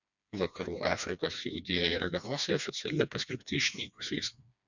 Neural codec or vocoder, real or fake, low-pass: codec, 16 kHz, 2 kbps, FreqCodec, smaller model; fake; 7.2 kHz